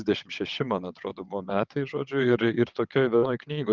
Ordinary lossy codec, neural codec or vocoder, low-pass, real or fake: Opus, 24 kbps; vocoder, 22.05 kHz, 80 mel bands, WaveNeXt; 7.2 kHz; fake